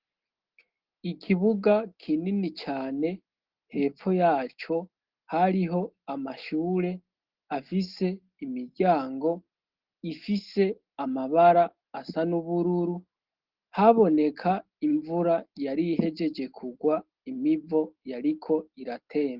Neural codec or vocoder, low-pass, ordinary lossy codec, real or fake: none; 5.4 kHz; Opus, 16 kbps; real